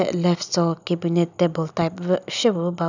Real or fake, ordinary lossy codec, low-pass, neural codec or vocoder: real; none; 7.2 kHz; none